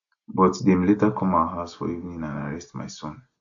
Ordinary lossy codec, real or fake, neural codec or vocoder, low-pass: AAC, 64 kbps; real; none; 7.2 kHz